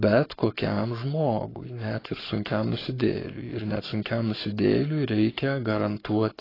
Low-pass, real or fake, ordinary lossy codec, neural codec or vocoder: 5.4 kHz; fake; AAC, 24 kbps; codec, 44.1 kHz, 7.8 kbps, Pupu-Codec